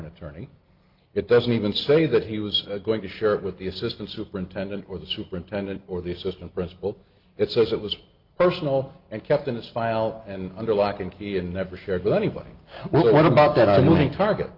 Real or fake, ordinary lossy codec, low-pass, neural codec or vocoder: real; Opus, 32 kbps; 5.4 kHz; none